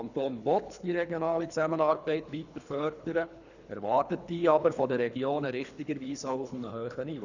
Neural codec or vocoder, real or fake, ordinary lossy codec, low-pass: codec, 24 kHz, 3 kbps, HILCodec; fake; none; 7.2 kHz